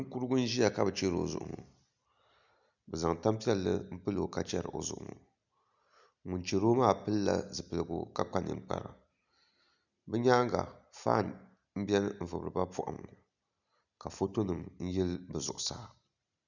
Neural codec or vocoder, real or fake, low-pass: none; real; 7.2 kHz